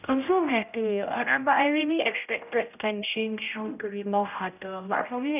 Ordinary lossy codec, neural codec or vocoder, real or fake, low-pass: none; codec, 16 kHz, 0.5 kbps, X-Codec, HuBERT features, trained on general audio; fake; 3.6 kHz